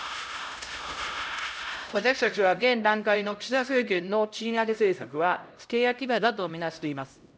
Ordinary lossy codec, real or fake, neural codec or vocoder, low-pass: none; fake; codec, 16 kHz, 0.5 kbps, X-Codec, HuBERT features, trained on LibriSpeech; none